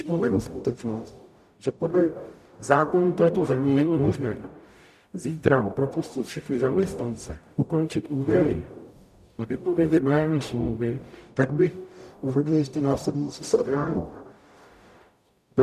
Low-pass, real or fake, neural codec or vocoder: 14.4 kHz; fake; codec, 44.1 kHz, 0.9 kbps, DAC